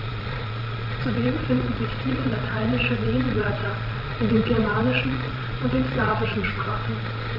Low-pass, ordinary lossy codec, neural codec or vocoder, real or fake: 5.4 kHz; none; vocoder, 22.05 kHz, 80 mel bands, Vocos; fake